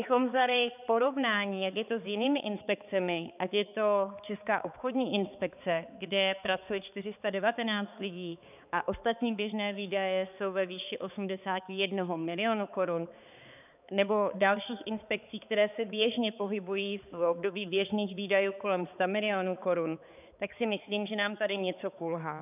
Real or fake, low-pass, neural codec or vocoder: fake; 3.6 kHz; codec, 16 kHz, 4 kbps, X-Codec, HuBERT features, trained on balanced general audio